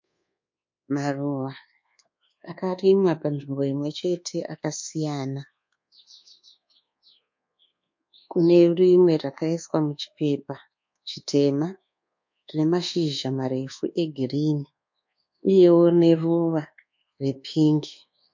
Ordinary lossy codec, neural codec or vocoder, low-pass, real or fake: MP3, 48 kbps; codec, 24 kHz, 1.2 kbps, DualCodec; 7.2 kHz; fake